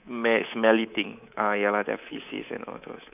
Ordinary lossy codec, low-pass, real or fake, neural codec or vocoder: none; 3.6 kHz; fake; codec, 24 kHz, 3.1 kbps, DualCodec